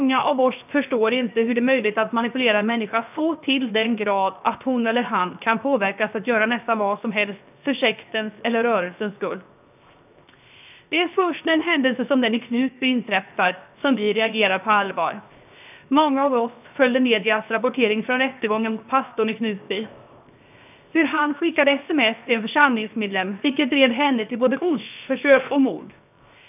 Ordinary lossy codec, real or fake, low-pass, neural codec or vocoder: none; fake; 3.6 kHz; codec, 16 kHz, 0.7 kbps, FocalCodec